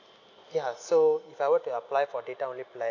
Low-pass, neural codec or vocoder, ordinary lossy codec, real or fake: 7.2 kHz; none; none; real